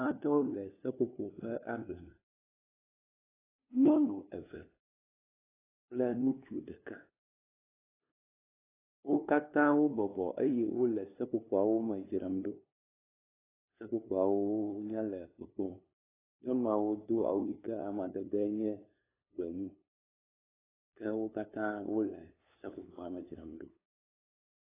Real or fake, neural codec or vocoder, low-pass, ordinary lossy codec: fake; codec, 16 kHz, 2 kbps, FunCodec, trained on LibriTTS, 25 frames a second; 3.6 kHz; AAC, 16 kbps